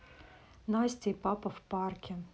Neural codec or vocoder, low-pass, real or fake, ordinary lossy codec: none; none; real; none